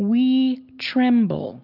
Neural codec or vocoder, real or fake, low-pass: none; real; 5.4 kHz